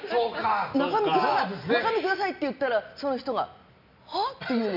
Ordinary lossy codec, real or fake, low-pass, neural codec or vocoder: none; real; 5.4 kHz; none